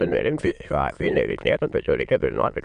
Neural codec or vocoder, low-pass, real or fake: autoencoder, 22.05 kHz, a latent of 192 numbers a frame, VITS, trained on many speakers; 9.9 kHz; fake